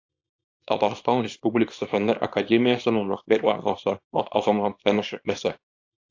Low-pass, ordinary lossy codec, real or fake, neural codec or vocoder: 7.2 kHz; AAC, 48 kbps; fake; codec, 24 kHz, 0.9 kbps, WavTokenizer, small release